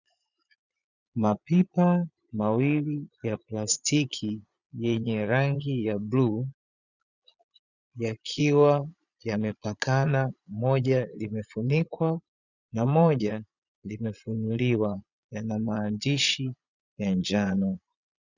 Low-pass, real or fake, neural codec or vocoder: 7.2 kHz; fake; vocoder, 22.05 kHz, 80 mel bands, Vocos